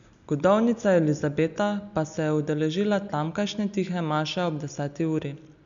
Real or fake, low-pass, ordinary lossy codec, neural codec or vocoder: real; 7.2 kHz; MP3, 96 kbps; none